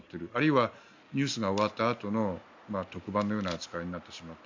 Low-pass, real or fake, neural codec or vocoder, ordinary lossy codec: 7.2 kHz; real; none; MP3, 64 kbps